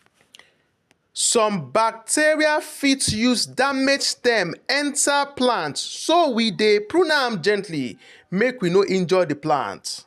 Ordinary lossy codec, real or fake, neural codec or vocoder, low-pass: none; real; none; 14.4 kHz